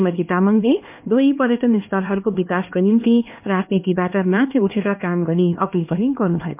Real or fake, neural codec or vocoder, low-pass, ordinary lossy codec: fake; codec, 16 kHz, 2 kbps, X-Codec, HuBERT features, trained on LibriSpeech; 3.6 kHz; MP3, 32 kbps